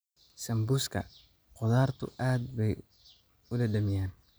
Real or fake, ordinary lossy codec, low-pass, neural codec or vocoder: real; none; none; none